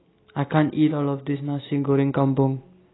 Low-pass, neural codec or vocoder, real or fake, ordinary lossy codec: 7.2 kHz; none; real; AAC, 16 kbps